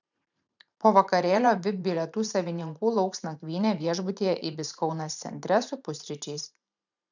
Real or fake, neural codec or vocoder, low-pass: fake; vocoder, 44.1 kHz, 128 mel bands every 512 samples, BigVGAN v2; 7.2 kHz